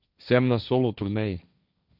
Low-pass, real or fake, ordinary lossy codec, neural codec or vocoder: 5.4 kHz; fake; none; codec, 16 kHz, 1.1 kbps, Voila-Tokenizer